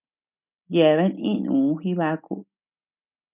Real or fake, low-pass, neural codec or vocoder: real; 3.6 kHz; none